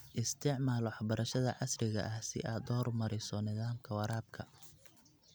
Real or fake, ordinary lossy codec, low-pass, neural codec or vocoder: real; none; none; none